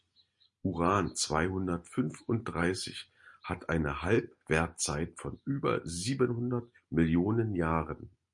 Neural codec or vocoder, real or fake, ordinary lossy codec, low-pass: none; real; MP3, 48 kbps; 10.8 kHz